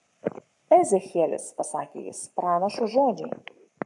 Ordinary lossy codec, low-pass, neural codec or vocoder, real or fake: AAC, 64 kbps; 10.8 kHz; codec, 44.1 kHz, 7.8 kbps, Pupu-Codec; fake